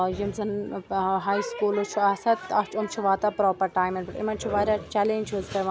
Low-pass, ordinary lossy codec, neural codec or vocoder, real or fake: none; none; none; real